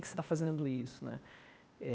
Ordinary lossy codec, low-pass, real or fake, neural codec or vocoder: none; none; fake; codec, 16 kHz, 0.8 kbps, ZipCodec